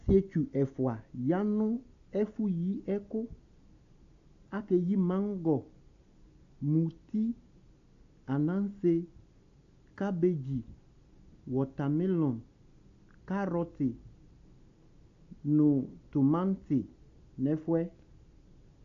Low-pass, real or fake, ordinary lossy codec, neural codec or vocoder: 7.2 kHz; real; AAC, 48 kbps; none